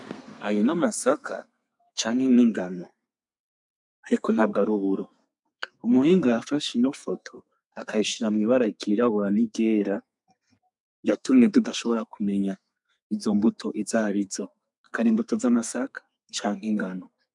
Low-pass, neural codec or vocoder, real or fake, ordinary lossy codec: 10.8 kHz; codec, 32 kHz, 1.9 kbps, SNAC; fake; MP3, 96 kbps